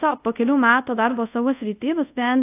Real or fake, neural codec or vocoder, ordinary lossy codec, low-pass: fake; codec, 24 kHz, 0.5 kbps, DualCodec; AAC, 32 kbps; 3.6 kHz